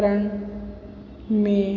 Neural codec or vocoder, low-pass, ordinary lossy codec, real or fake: none; 7.2 kHz; none; real